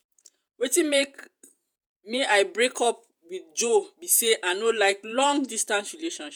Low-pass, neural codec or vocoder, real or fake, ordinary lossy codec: none; vocoder, 48 kHz, 128 mel bands, Vocos; fake; none